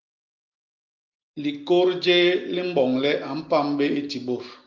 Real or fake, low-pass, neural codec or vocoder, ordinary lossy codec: real; 7.2 kHz; none; Opus, 32 kbps